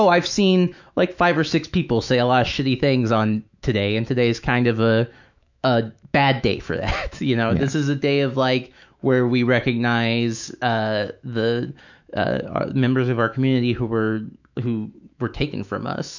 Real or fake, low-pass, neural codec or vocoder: fake; 7.2 kHz; autoencoder, 48 kHz, 128 numbers a frame, DAC-VAE, trained on Japanese speech